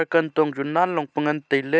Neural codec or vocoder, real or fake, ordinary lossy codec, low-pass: none; real; none; none